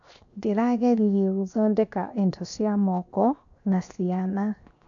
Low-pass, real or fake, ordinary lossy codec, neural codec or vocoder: 7.2 kHz; fake; none; codec, 16 kHz, 0.7 kbps, FocalCodec